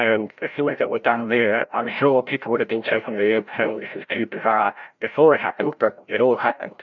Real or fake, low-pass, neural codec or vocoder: fake; 7.2 kHz; codec, 16 kHz, 0.5 kbps, FreqCodec, larger model